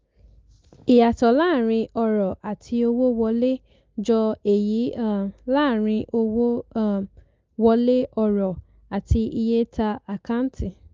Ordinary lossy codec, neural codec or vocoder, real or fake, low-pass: Opus, 32 kbps; none; real; 7.2 kHz